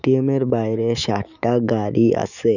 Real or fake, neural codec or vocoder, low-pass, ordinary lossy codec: fake; autoencoder, 48 kHz, 128 numbers a frame, DAC-VAE, trained on Japanese speech; 7.2 kHz; none